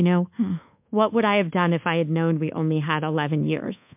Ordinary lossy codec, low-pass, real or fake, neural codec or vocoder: MP3, 32 kbps; 3.6 kHz; fake; codec, 24 kHz, 1.2 kbps, DualCodec